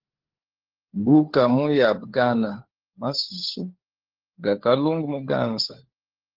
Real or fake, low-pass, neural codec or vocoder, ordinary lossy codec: fake; 5.4 kHz; codec, 16 kHz, 4 kbps, FunCodec, trained on LibriTTS, 50 frames a second; Opus, 16 kbps